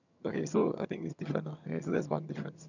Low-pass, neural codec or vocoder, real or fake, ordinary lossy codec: 7.2 kHz; vocoder, 22.05 kHz, 80 mel bands, HiFi-GAN; fake; none